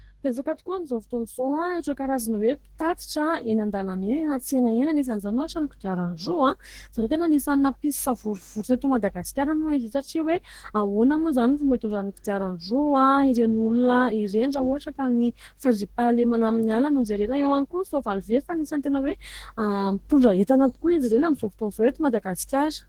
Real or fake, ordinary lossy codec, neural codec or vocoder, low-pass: fake; Opus, 16 kbps; codec, 44.1 kHz, 2.6 kbps, DAC; 19.8 kHz